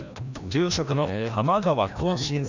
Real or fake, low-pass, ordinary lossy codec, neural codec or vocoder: fake; 7.2 kHz; none; codec, 16 kHz, 1 kbps, FreqCodec, larger model